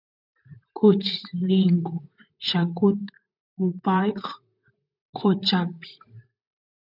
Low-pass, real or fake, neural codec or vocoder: 5.4 kHz; fake; vocoder, 44.1 kHz, 128 mel bands, Pupu-Vocoder